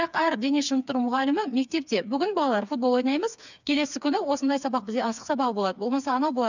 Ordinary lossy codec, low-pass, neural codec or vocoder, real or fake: none; 7.2 kHz; codec, 16 kHz, 4 kbps, FreqCodec, smaller model; fake